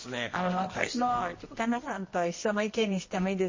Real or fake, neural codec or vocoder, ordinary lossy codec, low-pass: fake; codec, 24 kHz, 0.9 kbps, WavTokenizer, medium music audio release; MP3, 32 kbps; 7.2 kHz